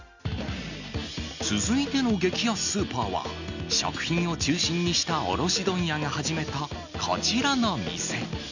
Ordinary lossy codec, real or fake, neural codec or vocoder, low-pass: none; real; none; 7.2 kHz